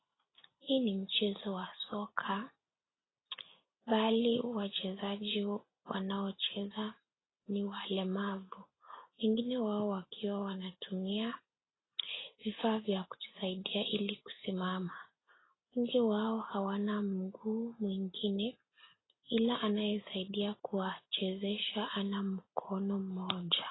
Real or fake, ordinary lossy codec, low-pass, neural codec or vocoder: real; AAC, 16 kbps; 7.2 kHz; none